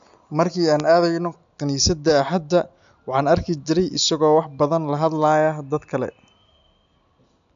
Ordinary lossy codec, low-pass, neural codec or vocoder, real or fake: AAC, 64 kbps; 7.2 kHz; none; real